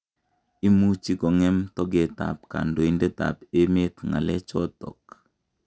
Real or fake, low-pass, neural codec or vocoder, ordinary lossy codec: real; none; none; none